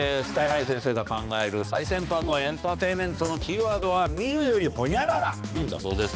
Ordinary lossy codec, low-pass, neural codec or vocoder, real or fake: none; none; codec, 16 kHz, 2 kbps, X-Codec, HuBERT features, trained on balanced general audio; fake